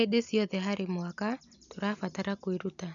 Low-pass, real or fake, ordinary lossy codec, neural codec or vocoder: 7.2 kHz; real; none; none